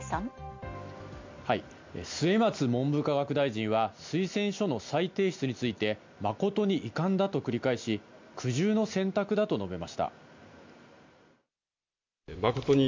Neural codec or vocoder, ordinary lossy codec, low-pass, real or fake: none; MP3, 64 kbps; 7.2 kHz; real